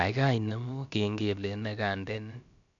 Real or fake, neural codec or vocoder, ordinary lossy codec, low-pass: fake; codec, 16 kHz, about 1 kbps, DyCAST, with the encoder's durations; none; 7.2 kHz